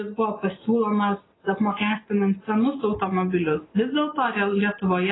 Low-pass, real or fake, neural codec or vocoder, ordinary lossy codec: 7.2 kHz; real; none; AAC, 16 kbps